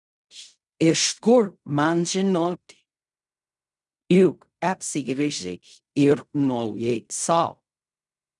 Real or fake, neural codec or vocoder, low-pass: fake; codec, 16 kHz in and 24 kHz out, 0.4 kbps, LongCat-Audio-Codec, fine tuned four codebook decoder; 10.8 kHz